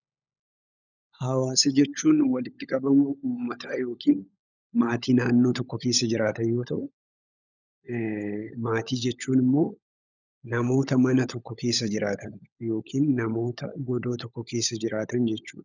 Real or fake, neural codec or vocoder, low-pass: fake; codec, 16 kHz, 16 kbps, FunCodec, trained on LibriTTS, 50 frames a second; 7.2 kHz